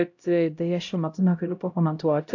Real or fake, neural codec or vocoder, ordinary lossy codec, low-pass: fake; codec, 16 kHz, 0.5 kbps, X-Codec, HuBERT features, trained on LibriSpeech; AAC, 48 kbps; 7.2 kHz